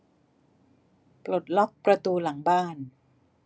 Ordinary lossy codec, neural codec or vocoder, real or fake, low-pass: none; none; real; none